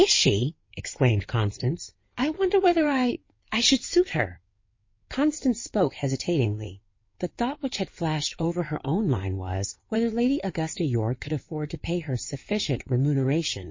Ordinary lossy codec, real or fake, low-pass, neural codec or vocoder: MP3, 32 kbps; fake; 7.2 kHz; codec, 16 kHz in and 24 kHz out, 2.2 kbps, FireRedTTS-2 codec